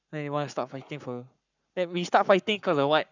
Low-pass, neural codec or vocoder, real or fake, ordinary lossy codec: 7.2 kHz; codec, 44.1 kHz, 7.8 kbps, Pupu-Codec; fake; none